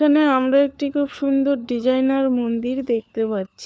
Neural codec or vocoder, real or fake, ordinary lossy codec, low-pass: codec, 16 kHz, 4 kbps, FunCodec, trained on LibriTTS, 50 frames a second; fake; none; none